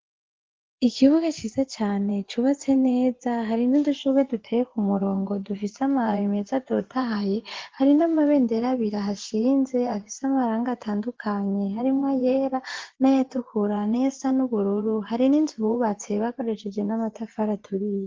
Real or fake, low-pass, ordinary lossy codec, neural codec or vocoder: fake; 7.2 kHz; Opus, 16 kbps; vocoder, 24 kHz, 100 mel bands, Vocos